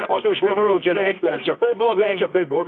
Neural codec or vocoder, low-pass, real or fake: codec, 24 kHz, 0.9 kbps, WavTokenizer, medium music audio release; 9.9 kHz; fake